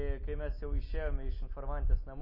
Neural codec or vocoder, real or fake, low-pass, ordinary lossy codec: none; real; 5.4 kHz; MP3, 24 kbps